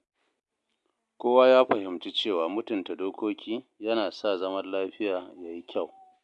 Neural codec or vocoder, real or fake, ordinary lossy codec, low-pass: none; real; MP3, 64 kbps; 10.8 kHz